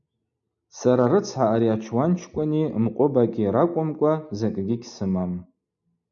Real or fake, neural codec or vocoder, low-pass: real; none; 7.2 kHz